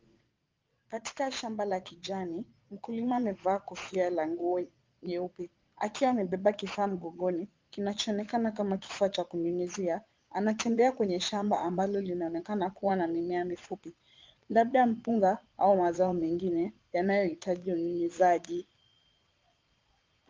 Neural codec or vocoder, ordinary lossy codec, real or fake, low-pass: vocoder, 22.05 kHz, 80 mel bands, Vocos; Opus, 32 kbps; fake; 7.2 kHz